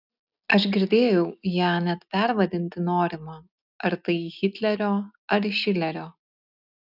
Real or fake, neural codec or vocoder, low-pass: real; none; 5.4 kHz